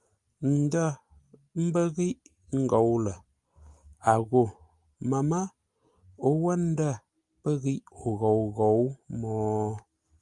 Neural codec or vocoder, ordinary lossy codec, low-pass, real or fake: none; Opus, 32 kbps; 10.8 kHz; real